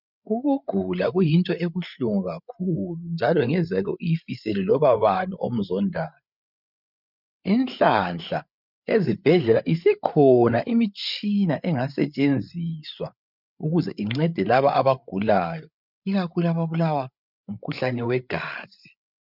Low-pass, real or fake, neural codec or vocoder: 5.4 kHz; fake; codec, 16 kHz, 8 kbps, FreqCodec, larger model